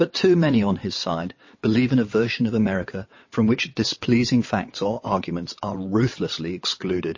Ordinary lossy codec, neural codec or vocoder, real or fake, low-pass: MP3, 32 kbps; vocoder, 22.05 kHz, 80 mel bands, WaveNeXt; fake; 7.2 kHz